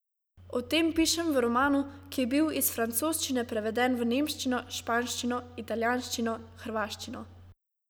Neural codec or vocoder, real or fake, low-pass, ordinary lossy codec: none; real; none; none